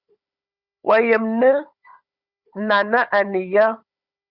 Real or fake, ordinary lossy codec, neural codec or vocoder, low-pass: fake; Opus, 64 kbps; codec, 16 kHz, 16 kbps, FunCodec, trained on Chinese and English, 50 frames a second; 5.4 kHz